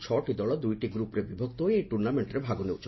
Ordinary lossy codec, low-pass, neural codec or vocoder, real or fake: MP3, 24 kbps; 7.2 kHz; none; real